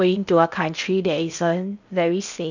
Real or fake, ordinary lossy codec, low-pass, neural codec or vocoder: fake; none; 7.2 kHz; codec, 16 kHz in and 24 kHz out, 0.6 kbps, FocalCodec, streaming, 4096 codes